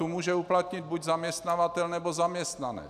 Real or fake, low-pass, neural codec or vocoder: real; 14.4 kHz; none